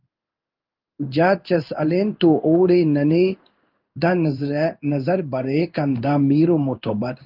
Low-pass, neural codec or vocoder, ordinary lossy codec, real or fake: 5.4 kHz; codec, 16 kHz in and 24 kHz out, 1 kbps, XY-Tokenizer; Opus, 32 kbps; fake